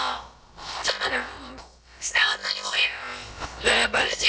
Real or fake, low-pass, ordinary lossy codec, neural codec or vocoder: fake; none; none; codec, 16 kHz, about 1 kbps, DyCAST, with the encoder's durations